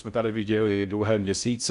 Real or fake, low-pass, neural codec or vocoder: fake; 10.8 kHz; codec, 16 kHz in and 24 kHz out, 0.6 kbps, FocalCodec, streaming, 4096 codes